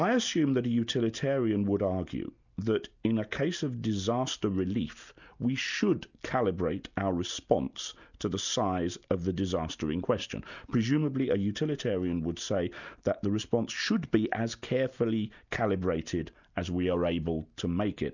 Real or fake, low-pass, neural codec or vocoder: real; 7.2 kHz; none